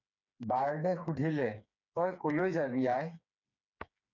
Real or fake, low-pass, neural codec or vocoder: fake; 7.2 kHz; codec, 16 kHz, 4 kbps, FreqCodec, smaller model